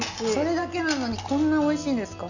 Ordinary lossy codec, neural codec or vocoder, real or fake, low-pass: none; none; real; 7.2 kHz